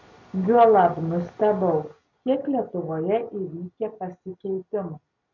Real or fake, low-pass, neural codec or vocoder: real; 7.2 kHz; none